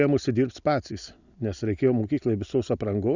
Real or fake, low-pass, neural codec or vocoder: real; 7.2 kHz; none